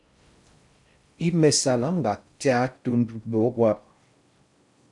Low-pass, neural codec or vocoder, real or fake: 10.8 kHz; codec, 16 kHz in and 24 kHz out, 0.6 kbps, FocalCodec, streaming, 4096 codes; fake